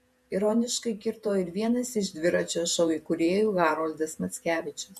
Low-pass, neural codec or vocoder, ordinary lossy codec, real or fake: 14.4 kHz; vocoder, 48 kHz, 128 mel bands, Vocos; MP3, 64 kbps; fake